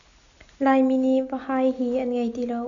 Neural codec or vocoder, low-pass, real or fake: none; 7.2 kHz; real